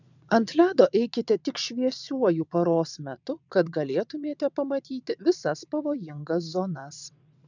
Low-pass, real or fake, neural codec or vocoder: 7.2 kHz; fake; vocoder, 22.05 kHz, 80 mel bands, WaveNeXt